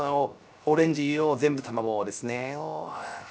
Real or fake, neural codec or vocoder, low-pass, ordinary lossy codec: fake; codec, 16 kHz, 0.3 kbps, FocalCodec; none; none